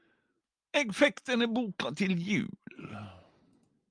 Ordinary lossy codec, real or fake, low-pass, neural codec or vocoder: Opus, 32 kbps; real; 9.9 kHz; none